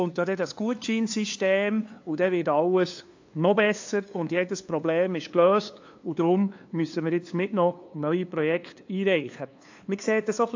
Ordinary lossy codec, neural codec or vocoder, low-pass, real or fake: AAC, 48 kbps; codec, 16 kHz, 2 kbps, FunCodec, trained on LibriTTS, 25 frames a second; 7.2 kHz; fake